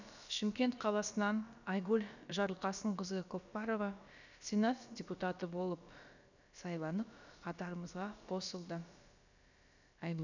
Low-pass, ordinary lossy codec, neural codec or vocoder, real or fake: 7.2 kHz; none; codec, 16 kHz, about 1 kbps, DyCAST, with the encoder's durations; fake